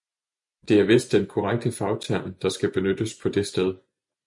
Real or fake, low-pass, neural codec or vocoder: real; 10.8 kHz; none